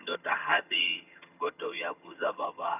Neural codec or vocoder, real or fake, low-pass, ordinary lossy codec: vocoder, 22.05 kHz, 80 mel bands, HiFi-GAN; fake; 3.6 kHz; none